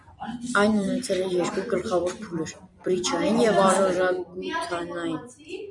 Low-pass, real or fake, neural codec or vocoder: 10.8 kHz; fake; vocoder, 44.1 kHz, 128 mel bands every 256 samples, BigVGAN v2